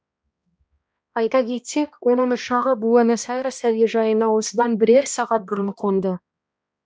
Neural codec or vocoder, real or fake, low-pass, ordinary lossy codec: codec, 16 kHz, 1 kbps, X-Codec, HuBERT features, trained on balanced general audio; fake; none; none